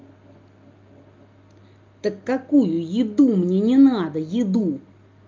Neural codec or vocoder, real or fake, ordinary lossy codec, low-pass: none; real; Opus, 24 kbps; 7.2 kHz